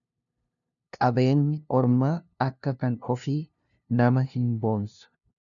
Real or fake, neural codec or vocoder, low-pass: fake; codec, 16 kHz, 0.5 kbps, FunCodec, trained on LibriTTS, 25 frames a second; 7.2 kHz